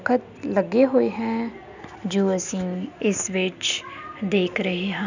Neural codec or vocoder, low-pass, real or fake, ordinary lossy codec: none; 7.2 kHz; real; none